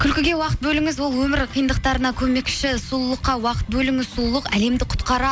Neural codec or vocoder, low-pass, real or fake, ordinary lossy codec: none; none; real; none